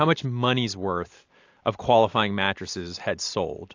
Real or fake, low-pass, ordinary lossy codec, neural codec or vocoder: real; 7.2 kHz; AAC, 48 kbps; none